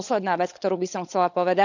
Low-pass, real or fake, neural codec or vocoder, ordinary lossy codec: 7.2 kHz; fake; codec, 16 kHz, 16 kbps, FunCodec, trained on LibriTTS, 50 frames a second; none